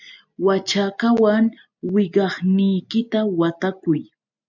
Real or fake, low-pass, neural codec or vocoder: real; 7.2 kHz; none